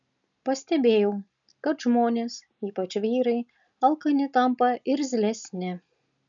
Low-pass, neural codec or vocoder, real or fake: 7.2 kHz; none; real